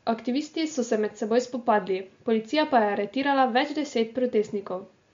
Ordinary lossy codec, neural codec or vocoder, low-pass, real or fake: MP3, 48 kbps; none; 7.2 kHz; real